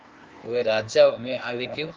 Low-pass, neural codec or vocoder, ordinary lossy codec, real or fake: 7.2 kHz; codec, 16 kHz, 0.8 kbps, ZipCodec; Opus, 32 kbps; fake